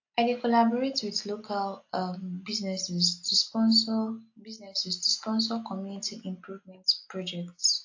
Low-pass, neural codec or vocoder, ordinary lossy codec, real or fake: 7.2 kHz; none; AAC, 48 kbps; real